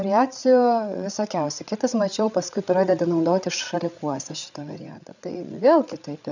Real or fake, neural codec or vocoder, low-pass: fake; codec, 16 kHz, 8 kbps, FreqCodec, larger model; 7.2 kHz